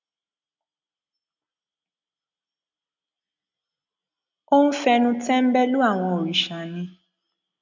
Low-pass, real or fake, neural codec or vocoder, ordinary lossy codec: 7.2 kHz; real; none; none